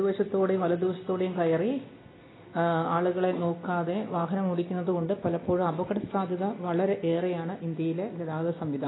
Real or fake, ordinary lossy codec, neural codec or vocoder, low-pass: real; AAC, 16 kbps; none; 7.2 kHz